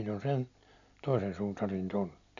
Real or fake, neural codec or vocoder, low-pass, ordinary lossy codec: real; none; 7.2 kHz; none